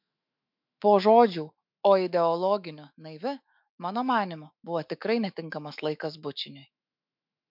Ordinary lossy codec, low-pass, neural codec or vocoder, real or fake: MP3, 48 kbps; 5.4 kHz; autoencoder, 48 kHz, 128 numbers a frame, DAC-VAE, trained on Japanese speech; fake